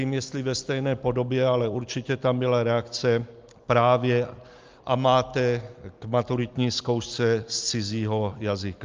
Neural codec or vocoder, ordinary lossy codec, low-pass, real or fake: none; Opus, 24 kbps; 7.2 kHz; real